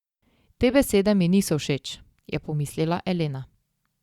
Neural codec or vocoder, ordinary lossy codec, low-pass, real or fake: none; Opus, 64 kbps; 19.8 kHz; real